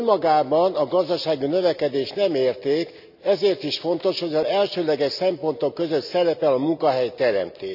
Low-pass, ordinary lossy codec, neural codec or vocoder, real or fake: 5.4 kHz; none; none; real